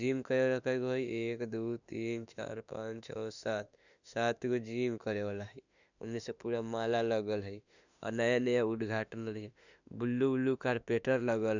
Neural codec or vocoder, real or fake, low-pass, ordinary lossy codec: autoencoder, 48 kHz, 32 numbers a frame, DAC-VAE, trained on Japanese speech; fake; 7.2 kHz; none